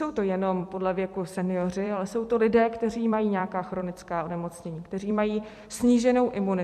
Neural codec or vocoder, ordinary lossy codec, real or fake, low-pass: vocoder, 44.1 kHz, 128 mel bands every 256 samples, BigVGAN v2; MP3, 64 kbps; fake; 14.4 kHz